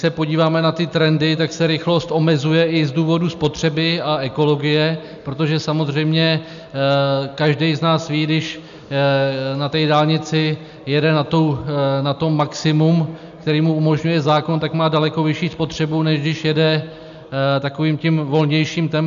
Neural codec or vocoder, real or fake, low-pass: none; real; 7.2 kHz